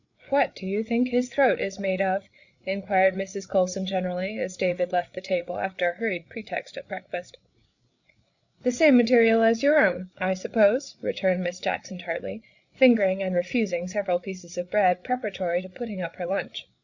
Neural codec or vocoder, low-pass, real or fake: codec, 16 kHz, 8 kbps, FreqCodec, larger model; 7.2 kHz; fake